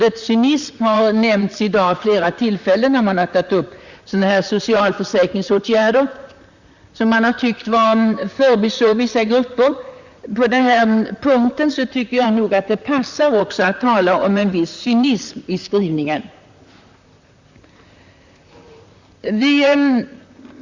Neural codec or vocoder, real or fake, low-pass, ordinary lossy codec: vocoder, 44.1 kHz, 128 mel bands, Pupu-Vocoder; fake; 7.2 kHz; Opus, 64 kbps